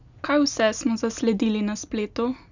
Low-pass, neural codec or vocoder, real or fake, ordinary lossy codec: 7.2 kHz; none; real; none